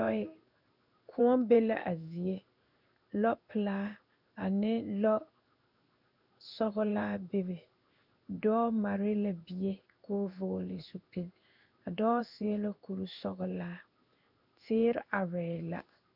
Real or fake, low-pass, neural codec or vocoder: fake; 5.4 kHz; codec, 16 kHz in and 24 kHz out, 1 kbps, XY-Tokenizer